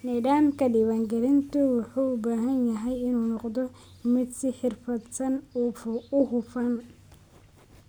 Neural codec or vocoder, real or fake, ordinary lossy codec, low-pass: none; real; none; none